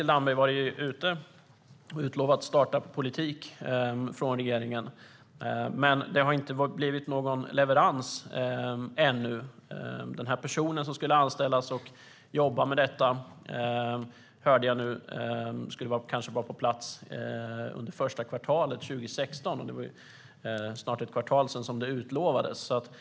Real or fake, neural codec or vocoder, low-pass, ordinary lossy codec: real; none; none; none